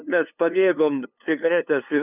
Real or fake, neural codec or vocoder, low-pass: fake; codec, 16 kHz, 2 kbps, FunCodec, trained on LibriTTS, 25 frames a second; 3.6 kHz